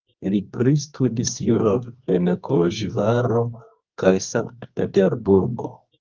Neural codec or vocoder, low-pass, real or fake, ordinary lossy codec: codec, 24 kHz, 0.9 kbps, WavTokenizer, medium music audio release; 7.2 kHz; fake; Opus, 32 kbps